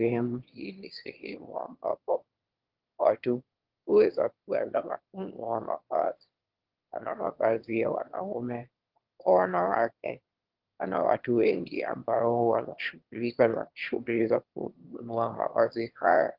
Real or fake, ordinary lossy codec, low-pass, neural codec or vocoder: fake; Opus, 16 kbps; 5.4 kHz; autoencoder, 22.05 kHz, a latent of 192 numbers a frame, VITS, trained on one speaker